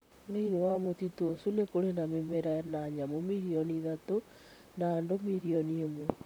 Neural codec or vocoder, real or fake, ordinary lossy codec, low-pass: vocoder, 44.1 kHz, 128 mel bands, Pupu-Vocoder; fake; none; none